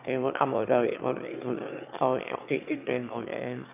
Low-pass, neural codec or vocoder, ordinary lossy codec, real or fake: 3.6 kHz; autoencoder, 22.05 kHz, a latent of 192 numbers a frame, VITS, trained on one speaker; AAC, 32 kbps; fake